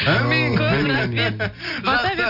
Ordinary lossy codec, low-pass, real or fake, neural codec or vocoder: none; 5.4 kHz; real; none